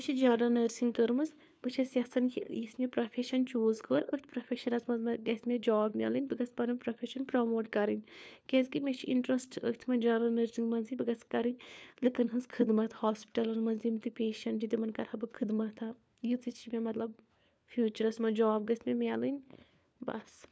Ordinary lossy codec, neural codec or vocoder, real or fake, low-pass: none; codec, 16 kHz, 4 kbps, FunCodec, trained on LibriTTS, 50 frames a second; fake; none